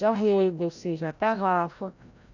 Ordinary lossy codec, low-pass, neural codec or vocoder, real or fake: none; 7.2 kHz; codec, 16 kHz, 0.5 kbps, FreqCodec, larger model; fake